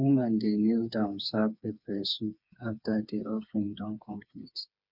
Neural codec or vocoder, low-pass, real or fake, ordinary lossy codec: codec, 16 kHz, 4 kbps, FreqCodec, smaller model; 5.4 kHz; fake; none